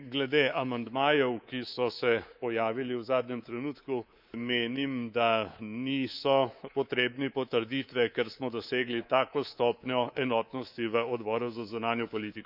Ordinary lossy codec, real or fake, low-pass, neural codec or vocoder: none; fake; 5.4 kHz; codec, 24 kHz, 3.1 kbps, DualCodec